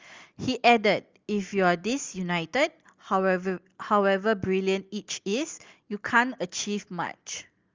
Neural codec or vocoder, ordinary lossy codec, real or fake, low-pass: none; Opus, 32 kbps; real; 7.2 kHz